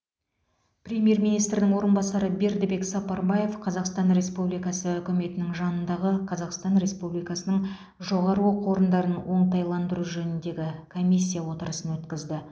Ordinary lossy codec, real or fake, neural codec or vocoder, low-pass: none; real; none; none